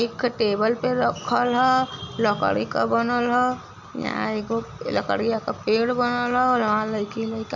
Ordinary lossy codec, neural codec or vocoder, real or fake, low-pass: none; none; real; 7.2 kHz